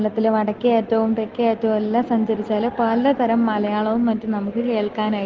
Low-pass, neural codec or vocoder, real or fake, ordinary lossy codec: 7.2 kHz; none; real; Opus, 16 kbps